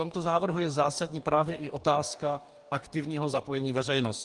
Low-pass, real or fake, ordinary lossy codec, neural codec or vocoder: 10.8 kHz; fake; Opus, 32 kbps; codec, 44.1 kHz, 2.6 kbps, DAC